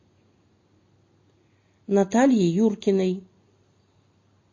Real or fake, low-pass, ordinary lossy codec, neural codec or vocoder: real; 7.2 kHz; MP3, 32 kbps; none